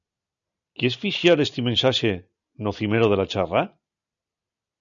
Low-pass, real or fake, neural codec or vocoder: 7.2 kHz; real; none